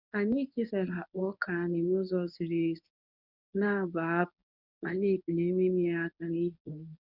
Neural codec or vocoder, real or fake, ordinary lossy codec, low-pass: codec, 24 kHz, 0.9 kbps, WavTokenizer, medium speech release version 1; fake; none; 5.4 kHz